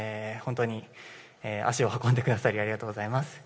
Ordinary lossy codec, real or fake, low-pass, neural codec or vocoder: none; real; none; none